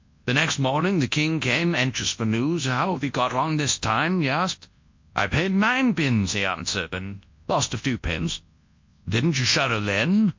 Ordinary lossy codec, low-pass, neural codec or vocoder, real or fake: MP3, 48 kbps; 7.2 kHz; codec, 24 kHz, 0.9 kbps, WavTokenizer, large speech release; fake